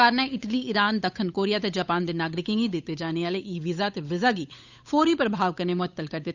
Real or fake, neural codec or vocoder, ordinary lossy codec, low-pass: fake; codec, 16 kHz, 8 kbps, FunCodec, trained on Chinese and English, 25 frames a second; none; 7.2 kHz